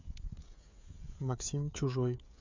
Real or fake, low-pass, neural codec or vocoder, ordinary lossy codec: fake; 7.2 kHz; codec, 16 kHz, 16 kbps, FreqCodec, smaller model; MP3, 64 kbps